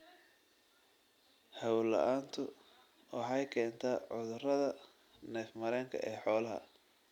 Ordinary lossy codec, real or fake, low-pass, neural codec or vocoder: none; real; 19.8 kHz; none